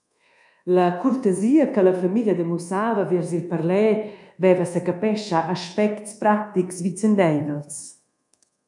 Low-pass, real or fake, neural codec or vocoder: 10.8 kHz; fake; codec, 24 kHz, 1.2 kbps, DualCodec